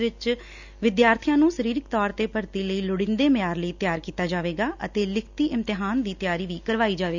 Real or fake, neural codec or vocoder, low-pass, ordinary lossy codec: real; none; 7.2 kHz; none